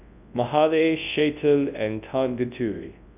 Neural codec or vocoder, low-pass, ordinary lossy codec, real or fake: codec, 24 kHz, 0.9 kbps, WavTokenizer, large speech release; 3.6 kHz; none; fake